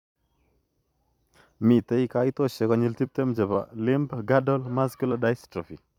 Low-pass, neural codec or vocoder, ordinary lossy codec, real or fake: 19.8 kHz; vocoder, 44.1 kHz, 128 mel bands every 256 samples, BigVGAN v2; none; fake